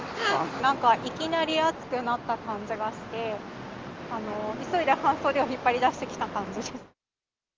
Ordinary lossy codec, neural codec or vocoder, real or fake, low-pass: Opus, 32 kbps; none; real; 7.2 kHz